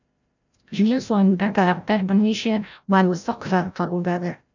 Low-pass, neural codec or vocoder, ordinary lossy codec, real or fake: 7.2 kHz; codec, 16 kHz, 0.5 kbps, FreqCodec, larger model; none; fake